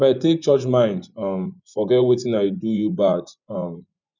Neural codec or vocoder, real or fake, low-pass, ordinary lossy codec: vocoder, 24 kHz, 100 mel bands, Vocos; fake; 7.2 kHz; none